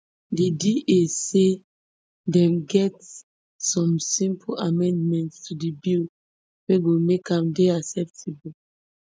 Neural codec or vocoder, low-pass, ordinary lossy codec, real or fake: none; none; none; real